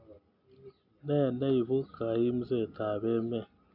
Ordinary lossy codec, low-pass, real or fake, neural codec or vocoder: none; 5.4 kHz; real; none